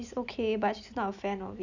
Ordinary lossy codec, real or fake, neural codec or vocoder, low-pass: none; real; none; 7.2 kHz